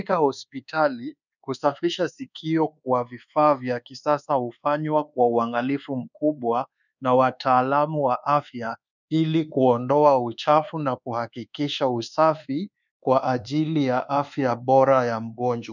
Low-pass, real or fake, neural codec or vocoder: 7.2 kHz; fake; codec, 24 kHz, 1.2 kbps, DualCodec